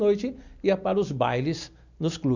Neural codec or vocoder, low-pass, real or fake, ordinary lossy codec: none; 7.2 kHz; real; none